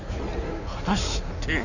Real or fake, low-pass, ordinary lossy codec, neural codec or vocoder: fake; 7.2 kHz; none; codec, 16 kHz in and 24 kHz out, 1.1 kbps, FireRedTTS-2 codec